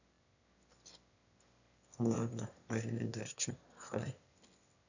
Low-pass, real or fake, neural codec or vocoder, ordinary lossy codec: 7.2 kHz; fake; autoencoder, 22.05 kHz, a latent of 192 numbers a frame, VITS, trained on one speaker; none